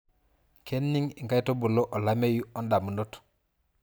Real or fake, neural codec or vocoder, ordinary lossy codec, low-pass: real; none; none; none